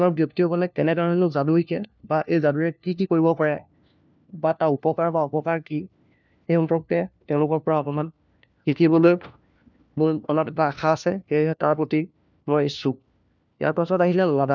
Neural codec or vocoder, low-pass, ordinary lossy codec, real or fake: codec, 16 kHz, 1 kbps, FunCodec, trained on LibriTTS, 50 frames a second; 7.2 kHz; none; fake